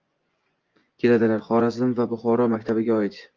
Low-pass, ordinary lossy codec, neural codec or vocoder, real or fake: 7.2 kHz; Opus, 32 kbps; vocoder, 24 kHz, 100 mel bands, Vocos; fake